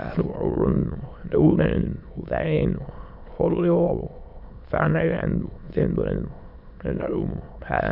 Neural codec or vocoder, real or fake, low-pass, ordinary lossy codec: autoencoder, 22.05 kHz, a latent of 192 numbers a frame, VITS, trained on many speakers; fake; 5.4 kHz; none